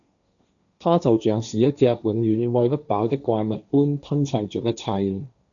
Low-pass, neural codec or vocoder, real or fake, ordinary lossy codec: 7.2 kHz; codec, 16 kHz, 1.1 kbps, Voila-Tokenizer; fake; MP3, 96 kbps